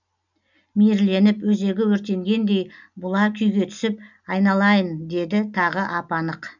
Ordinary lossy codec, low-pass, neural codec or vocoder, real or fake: none; 7.2 kHz; none; real